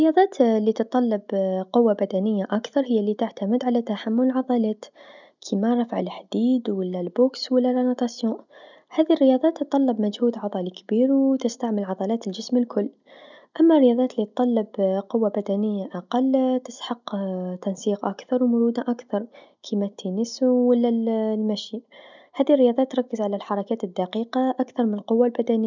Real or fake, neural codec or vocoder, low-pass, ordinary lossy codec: real; none; 7.2 kHz; none